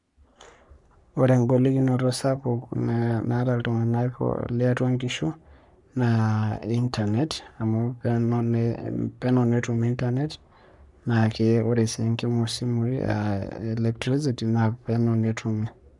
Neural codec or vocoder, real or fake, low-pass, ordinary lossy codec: codec, 44.1 kHz, 3.4 kbps, Pupu-Codec; fake; 10.8 kHz; none